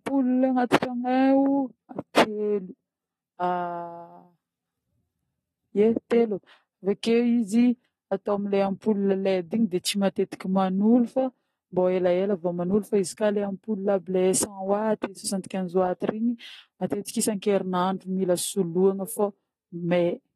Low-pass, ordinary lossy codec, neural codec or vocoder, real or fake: 19.8 kHz; AAC, 32 kbps; none; real